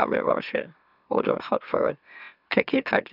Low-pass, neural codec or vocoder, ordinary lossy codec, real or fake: 5.4 kHz; autoencoder, 44.1 kHz, a latent of 192 numbers a frame, MeloTTS; none; fake